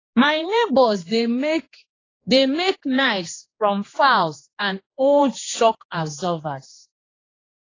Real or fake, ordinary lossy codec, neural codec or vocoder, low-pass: fake; AAC, 32 kbps; codec, 16 kHz, 2 kbps, X-Codec, HuBERT features, trained on general audio; 7.2 kHz